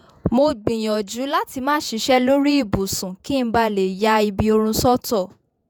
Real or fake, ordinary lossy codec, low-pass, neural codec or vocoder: fake; none; none; vocoder, 48 kHz, 128 mel bands, Vocos